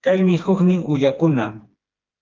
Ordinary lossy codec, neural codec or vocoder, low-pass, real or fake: Opus, 24 kbps; codec, 16 kHz, 2 kbps, FreqCodec, smaller model; 7.2 kHz; fake